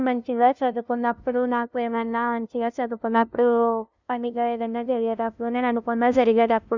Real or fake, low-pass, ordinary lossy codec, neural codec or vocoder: fake; 7.2 kHz; none; codec, 16 kHz, 0.5 kbps, FunCodec, trained on LibriTTS, 25 frames a second